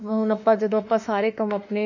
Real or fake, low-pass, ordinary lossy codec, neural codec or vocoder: fake; 7.2 kHz; none; autoencoder, 48 kHz, 32 numbers a frame, DAC-VAE, trained on Japanese speech